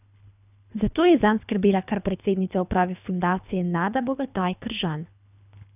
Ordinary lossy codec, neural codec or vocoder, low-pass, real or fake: none; codec, 24 kHz, 3 kbps, HILCodec; 3.6 kHz; fake